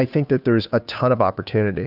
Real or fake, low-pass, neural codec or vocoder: fake; 5.4 kHz; codec, 16 kHz, 2 kbps, FunCodec, trained on Chinese and English, 25 frames a second